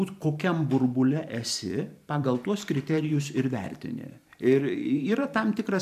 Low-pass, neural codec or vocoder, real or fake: 14.4 kHz; vocoder, 44.1 kHz, 128 mel bands every 512 samples, BigVGAN v2; fake